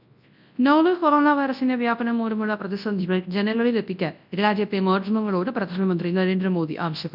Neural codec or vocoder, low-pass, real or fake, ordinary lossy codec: codec, 24 kHz, 0.9 kbps, WavTokenizer, large speech release; 5.4 kHz; fake; none